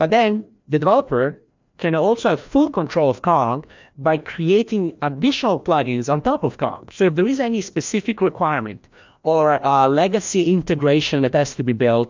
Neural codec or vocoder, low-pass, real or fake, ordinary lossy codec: codec, 16 kHz, 1 kbps, FreqCodec, larger model; 7.2 kHz; fake; MP3, 64 kbps